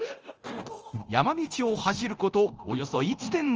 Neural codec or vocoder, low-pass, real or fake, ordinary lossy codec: codec, 24 kHz, 0.9 kbps, DualCodec; 7.2 kHz; fake; Opus, 24 kbps